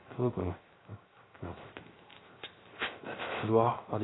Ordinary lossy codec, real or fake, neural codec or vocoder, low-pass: AAC, 16 kbps; fake; codec, 16 kHz, 0.3 kbps, FocalCodec; 7.2 kHz